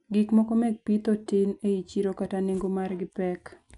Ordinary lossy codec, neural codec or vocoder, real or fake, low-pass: none; none; real; 10.8 kHz